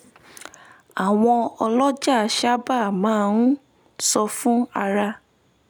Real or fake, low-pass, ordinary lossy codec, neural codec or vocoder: real; none; none; none